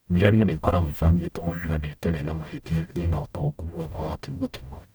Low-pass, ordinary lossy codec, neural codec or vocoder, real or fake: none; none; codec, 44.1 kHz, 0.9 kbps, DAC; fake